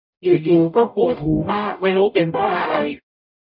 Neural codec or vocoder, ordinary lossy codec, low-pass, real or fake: codec, 44.1 kHz, 0.9 kbps, DAC; none; 5.4 kHz; fake